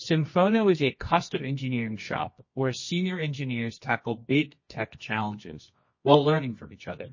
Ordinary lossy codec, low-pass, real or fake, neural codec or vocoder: MP3, 32 kbps; 7.2 kHz; fake; codec, 24 kHz, 0.9 kbps, WavTokenizer, medium music audio release